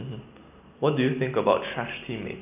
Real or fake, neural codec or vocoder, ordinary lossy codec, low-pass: real; none; none; 3.6 kHz